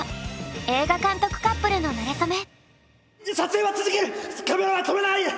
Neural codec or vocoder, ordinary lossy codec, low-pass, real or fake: none; none; none; real